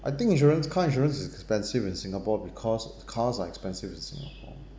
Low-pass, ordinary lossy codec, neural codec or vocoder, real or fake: none; none; none; real